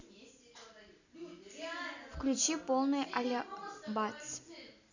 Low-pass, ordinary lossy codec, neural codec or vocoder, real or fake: 7.2 kHz; none; none; real